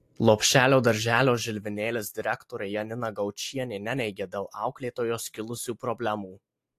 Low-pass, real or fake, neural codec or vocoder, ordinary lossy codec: 14.4 kHz; real; none; AAC, 64 kbps